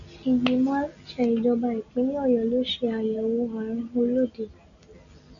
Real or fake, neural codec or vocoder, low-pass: real; none; 7.2 kHz